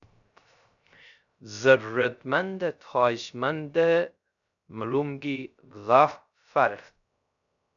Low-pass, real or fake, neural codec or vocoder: 7.2 kHz; fake; codec, 16 kHz, 0.3 kbps, FocalCodec